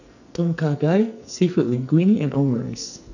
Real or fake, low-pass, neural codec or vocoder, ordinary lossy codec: fake; 7.2 kHz; codec, 44.1 kHz, 2.6 kbps, SNAC; none